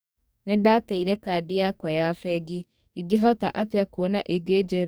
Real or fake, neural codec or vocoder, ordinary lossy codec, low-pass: fake; codec, 44.1 kHz, 2.6 kbps, DAC; none; none